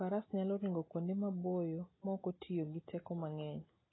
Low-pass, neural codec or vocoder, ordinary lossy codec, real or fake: 7.2 kHz; none; AAC, 16 kbps; real